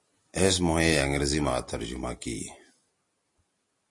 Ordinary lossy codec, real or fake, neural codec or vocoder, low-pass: MP3, 48 kbps; real; none; 10.8 kHz